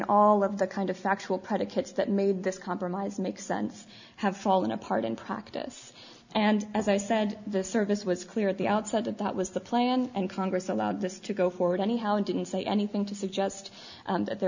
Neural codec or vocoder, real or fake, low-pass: none; real; 7.2 kHz